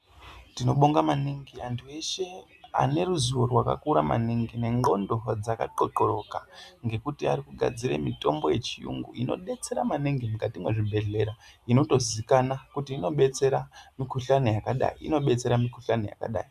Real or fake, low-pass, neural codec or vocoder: real; 14.4 kHz; none